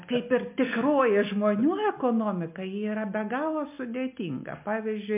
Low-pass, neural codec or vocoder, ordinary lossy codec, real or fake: 3.6 kHz; none; MP3, 32 kbps; real